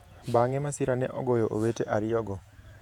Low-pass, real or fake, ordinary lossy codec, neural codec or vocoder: 19.8 kHz; fake; none; vocoder, 48 kHz, 128 mel bands, Vocos